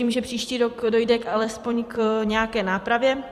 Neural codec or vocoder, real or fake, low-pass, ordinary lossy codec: vocoder, 44.1 kHz, 128 mel bands every 256 samples, BigVGAN v2; fake; 14.4 kHz; Opus, 64 kbps